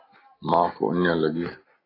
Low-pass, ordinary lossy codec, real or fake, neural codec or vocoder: 5.4 kHz; AAC, 24 kbps; real; none